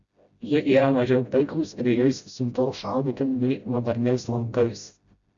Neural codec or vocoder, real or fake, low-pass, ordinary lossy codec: codec, 16 kHz, 0.5 kbps, FreqCodec, smaller model; fake; 7.2 kHz; Opus, 64 kbps